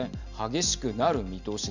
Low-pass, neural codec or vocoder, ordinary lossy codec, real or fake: 7.2 kHz; none; none; real